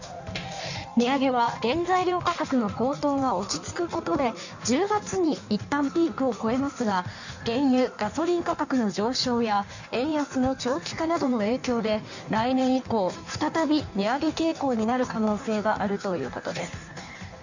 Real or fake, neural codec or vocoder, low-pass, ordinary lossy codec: fake; codec, 16 kHz in and 24 kHz out, 1.1 kbps, FireRedTTS-2 codec; 7.2 kHz; none